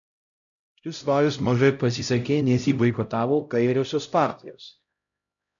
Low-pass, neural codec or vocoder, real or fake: 7.2 kHz; codec, 16 kHz, 0.5 kbps, X-Codec, HuBERT features, trained on LibriSpeech; fake